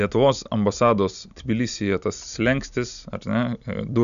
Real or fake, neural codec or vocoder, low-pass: real; none; 7.2 kHz